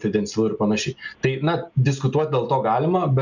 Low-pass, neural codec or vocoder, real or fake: 7.2 kHz; none; real